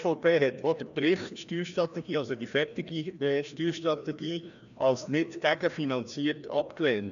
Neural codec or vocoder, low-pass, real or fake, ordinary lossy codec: codec, 16 kHz, 1 kbps, FreqCodec, larger model; 7.2 kHz; fake; none